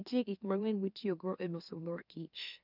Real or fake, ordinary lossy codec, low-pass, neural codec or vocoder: fake; none; 5.4 kHz; autoencoder, 44.1 kHz, a latent of 192 numbers a frame, MeloTTS